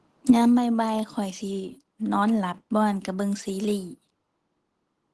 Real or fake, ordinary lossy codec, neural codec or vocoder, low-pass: real; Opus, 16 kbps; none; 10.8 kHz